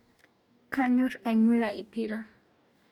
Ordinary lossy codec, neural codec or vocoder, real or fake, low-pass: none; codec, 44.1 kHz, 2.6 kbps, DAC; fake; 19.8 kHz